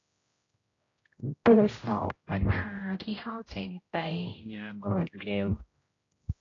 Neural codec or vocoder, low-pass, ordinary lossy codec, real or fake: codec, 16 kHz, 0.5 kbps, X-Codec, HuBERT features, trained on general audio; 7.2 kHz; AAC, 32 kbps; fake